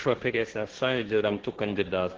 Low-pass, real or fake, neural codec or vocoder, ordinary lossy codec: 7.2 kHz; fake; codec, 16 kHz, 1.1 kbps, Voila-Tokenizer; Opus, 16 kbps